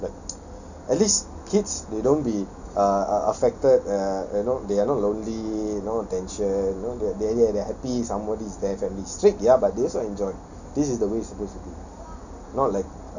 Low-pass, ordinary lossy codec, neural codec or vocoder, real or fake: 7.2 kHz; none; none; real